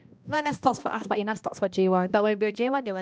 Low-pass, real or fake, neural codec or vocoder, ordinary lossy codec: none; fake; codec, 16 kHz, 1 kbps, X-Codec, HuBERT features, trained on balanced general audio; none